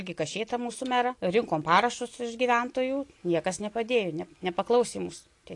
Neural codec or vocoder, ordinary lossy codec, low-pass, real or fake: none; AAC, 48 kbps; 10.8 kHz; real